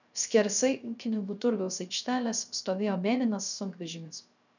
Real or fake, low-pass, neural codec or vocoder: fake; 7.2 kHz; codec, 16 kHz, 0.3 kbps, FocalCodec